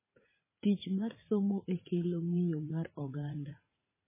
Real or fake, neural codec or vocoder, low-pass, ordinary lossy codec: fake; vocoder, 44.1 kHz, 80 mel bands, Vocos; 3.6 kHz; MP3, 16 kbps